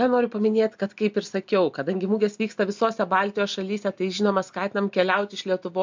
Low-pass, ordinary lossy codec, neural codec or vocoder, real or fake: 7.2 kHz; MP3, 64 kbps; none; real